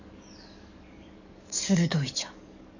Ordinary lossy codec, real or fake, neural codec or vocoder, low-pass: none; fake; codec, 44.1 kHz, 7.8 kbps, DAC; 7.2 kHz